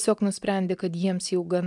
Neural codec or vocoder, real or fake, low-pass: none; real; 10.8 kHz